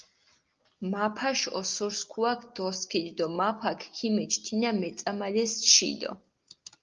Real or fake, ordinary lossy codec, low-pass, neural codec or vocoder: real; Opus, 24 kbps; 7.2 kHz; none